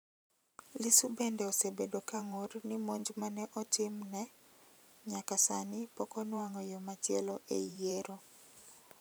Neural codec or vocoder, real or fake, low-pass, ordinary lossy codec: vocoder, 44.1 kHz, 128 mel bands every 512 samples, BigVGAN v2; fake; none; none